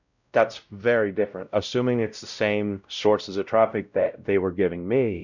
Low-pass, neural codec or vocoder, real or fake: 7.2 kHz; codec, 16 kHz, 0.5 kbps, X-Codec, WavLM features, trained on Multilingual LibriSpeech; fake